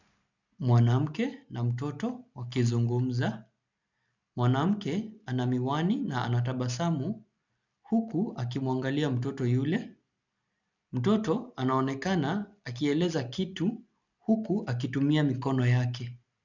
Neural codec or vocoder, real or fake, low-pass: none; real; 7.2 kHz